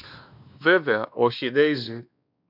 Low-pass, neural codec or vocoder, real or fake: 5.4 kHz; codec, 16 kHz, 2 kbps, X-Codec, WavLM features, trained on Multilingual LibriSpeech; fake